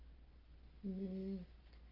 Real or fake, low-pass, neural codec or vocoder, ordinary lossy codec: real; 5.4 kHz; none; Opus, 32 kbps